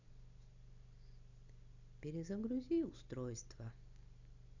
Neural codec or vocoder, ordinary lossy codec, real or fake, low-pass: none; none; real; 7.2 kHz